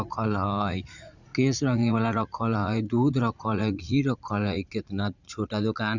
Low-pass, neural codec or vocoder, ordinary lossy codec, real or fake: 7.2 kHz; vocoder, 44.1 kHz, 80 mel bands, Vocos; Opus, 64 kbps; fake